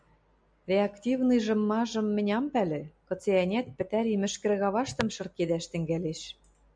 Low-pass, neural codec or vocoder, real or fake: 9.9 kHz; none; real